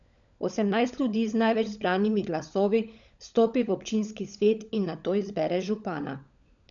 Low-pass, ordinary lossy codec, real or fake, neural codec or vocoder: 7.2 kHz; none; fake; codec, 16 kHz, 16 kbps, FunCodec, trained on LibriTTS, 50 frames a second